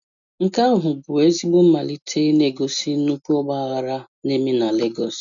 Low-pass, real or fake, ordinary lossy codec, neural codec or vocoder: 7.2 kHz; real; none; none